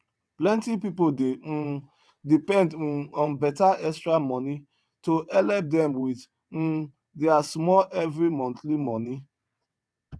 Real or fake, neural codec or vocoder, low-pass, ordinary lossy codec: fake; vocoder, 22.05 kHz, 80 mel bands, WaveNeXt; none; none